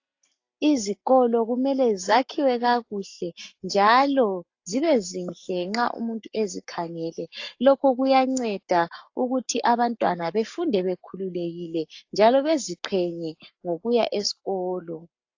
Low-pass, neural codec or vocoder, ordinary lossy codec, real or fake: 7.2 kHz; codec, 44.1 kHz, 7.8 kbps, Pupu-Codec; AAC, 48 kbps; fake